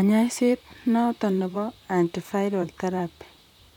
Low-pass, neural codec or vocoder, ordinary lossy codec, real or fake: 19.8 kHz; vocoder, 44.1 kHz, 128 mel bands, Pupu-Vocoder; none; fake